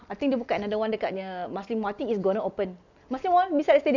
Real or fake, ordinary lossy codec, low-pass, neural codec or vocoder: real; none; 7.2 kHz; none